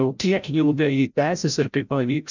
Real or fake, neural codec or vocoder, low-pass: fake; codec, 16 kHz, 0.5 kbps, FreqCodec, larger model; 7.2 kHz